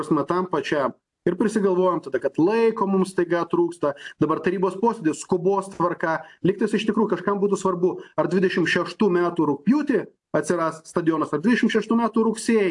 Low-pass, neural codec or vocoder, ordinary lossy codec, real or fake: 10.8 kHz; none; AAC, 64 kbps; real